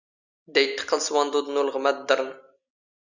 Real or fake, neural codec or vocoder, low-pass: real; none; 7.2 kHz